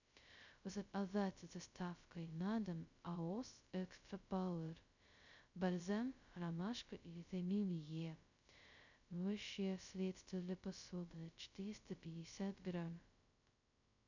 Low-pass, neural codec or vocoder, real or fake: 7.2 kHz; codec, 16 kHz, 0.2 kbps, FocalCodec; fake